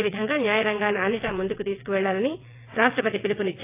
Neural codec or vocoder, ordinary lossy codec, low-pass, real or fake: vocoder, 22.05 kHz, 80 mel bands, WaveNeXt; AAC, 24 kbps; 3.6 kHz; fake